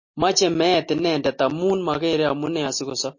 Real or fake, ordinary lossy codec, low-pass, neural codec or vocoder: real; MP3, 32 kbps; 7.2 kHz; none